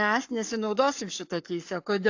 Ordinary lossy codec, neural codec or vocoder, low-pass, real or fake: AAC, 48 kbps; codec, 44.1 kHz, 7.8 kbps, Pupu-Codec; 7.2 kHz; fake